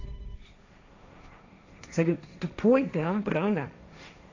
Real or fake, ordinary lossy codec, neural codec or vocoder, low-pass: fake; AAC, 48 kbps; codec, 16 kHz, 1.1 kbps, Voila-Tokenizer; 7.2 kHz